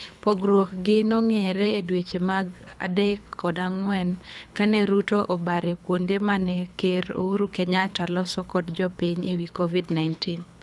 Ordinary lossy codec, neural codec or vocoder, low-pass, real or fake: none; codec, 24 kHz, 3 kbps, HILCodec; none; fake